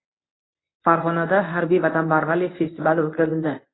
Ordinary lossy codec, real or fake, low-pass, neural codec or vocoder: AAC, 16 kbps; fake; 7.2 kHz; codec, 24 kHz, 0.9 kbps, WavTokenizer, medium speech release version 1